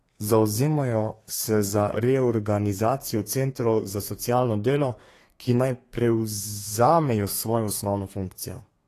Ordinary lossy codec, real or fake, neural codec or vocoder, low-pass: AAC, 48 kbps; fake; codec, 32 kHz, 1.9 kbps, SNAC; 14.4 kHz